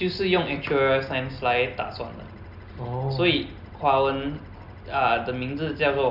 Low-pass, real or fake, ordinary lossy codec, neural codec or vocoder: 5.4 kHz; real; none; none